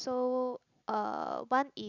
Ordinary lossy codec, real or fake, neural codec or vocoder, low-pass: none; real; none; 7.2 kHz